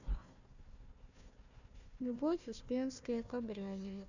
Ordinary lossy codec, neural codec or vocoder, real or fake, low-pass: none; codec, 16 kHz, 1 kbps, FunCodec, trained on Chinese and English, 50 frames a second; fake; 7.2 kHz